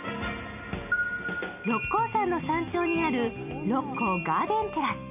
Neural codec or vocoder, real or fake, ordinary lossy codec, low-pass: none; real; MP3, 32 kbps; 3.6 kHz